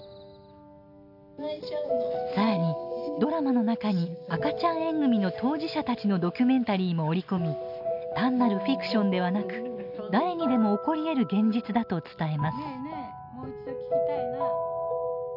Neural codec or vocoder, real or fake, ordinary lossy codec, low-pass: none; real; none; 5.4 kHz